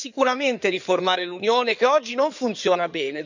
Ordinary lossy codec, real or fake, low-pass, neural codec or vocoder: none; fake; 7.2 kHz; codec, 16 kHz in and 24 kHz out, 2.2 kbps, FireRedTTS-2 codec